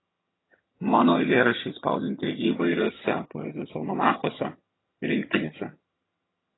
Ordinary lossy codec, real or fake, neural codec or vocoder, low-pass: AAC, 16 kbps; fake; vocoder, 22.05 kHz, 80 mel bands, HiFi-GAN; 7.2 kHz